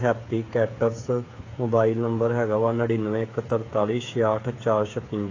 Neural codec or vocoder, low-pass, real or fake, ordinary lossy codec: codec, 16 kHz, 8 kbps, FreqCodec, smaller model; 7.2 kHz; fake; AAC, 32 kbps